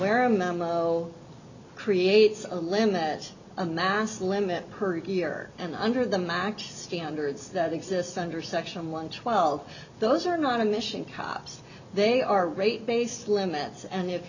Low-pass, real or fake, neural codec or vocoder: 7.2 kHz; real; none